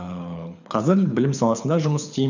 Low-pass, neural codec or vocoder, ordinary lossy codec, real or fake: 7.2 kHz; codec, 24 kHz, 6 kbps, HILCodec; none; fake